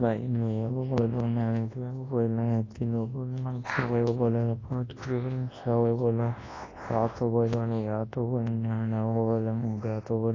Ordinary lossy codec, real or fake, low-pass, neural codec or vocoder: AAC, 32 kbps; fake; 7.2 kHz; codec, 24 kHz, 0.9 kbps, WavTokenizer, large speech release